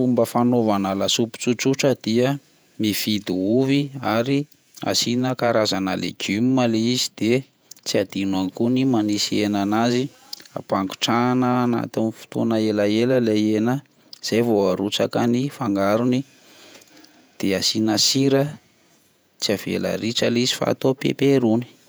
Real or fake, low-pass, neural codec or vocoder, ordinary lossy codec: fake; none; vocoder, 48 kHz, 128 mel bands, Vocos; none